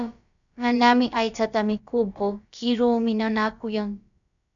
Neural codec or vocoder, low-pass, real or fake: codec, 16 kHz, about 1 kbps, DyCAST, with the encoder's durations; 7.2 kHz; fake